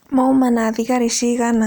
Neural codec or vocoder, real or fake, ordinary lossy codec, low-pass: none; real; none; none